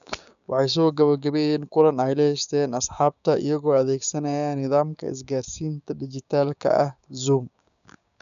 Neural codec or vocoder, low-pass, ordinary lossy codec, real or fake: codec, 16 kHz, 6 kbps, DAC; 7.2 kHz; none; fake